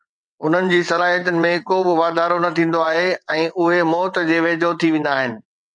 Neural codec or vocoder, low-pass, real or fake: vocoder, 22.05 kHz, 80 mel bands, WaveNeXt; 9.9 kHz; fake